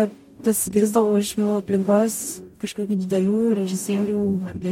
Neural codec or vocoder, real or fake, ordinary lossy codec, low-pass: codec, 44.1 kHz, 0.9 kbps, DAC; fake; MP3, 64 kbps; 19.8 kHz